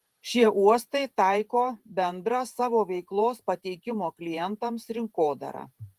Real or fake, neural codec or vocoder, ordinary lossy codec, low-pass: fake; vocoder, 48 kHz, 128 mel bands, Vocos; Opus, 24 kbps; 14.4 kHz